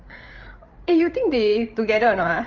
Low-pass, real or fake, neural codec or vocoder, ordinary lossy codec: 7.2 kHz; fake; codec, 16 kHz, 8 kbps, FreqCodec, larger model; Opus, 32 kbps